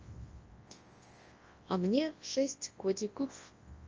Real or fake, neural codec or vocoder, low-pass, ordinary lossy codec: fake; codec, 24 kHz, 0.9 kbps, WavTokenizer, large speech release; 7.2 kHz; Opus, 24 kbps